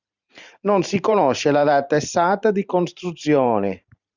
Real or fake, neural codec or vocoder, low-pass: fake; vocoder, 44.1 kHz, 128 mel bands every 512 samples, BigVGAN v2; 7.2 kHz